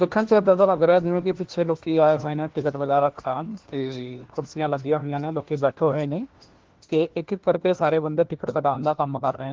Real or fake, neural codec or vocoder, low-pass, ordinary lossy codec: fake; codec, 16 kHz, 1 kbps, FunCodec, trained on LibriTTS, 50 frames a second; 7.2 kHz; Opus, 16 kbps